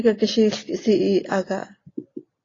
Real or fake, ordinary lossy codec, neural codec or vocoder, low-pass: real; AAC, 32 kbps; none; 7.2 kHz